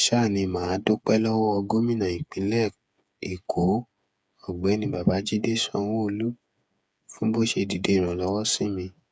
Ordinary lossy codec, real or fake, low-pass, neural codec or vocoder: none; fake; none; codec, 16 kHz, 8 kbps, FreqCodec, smaller model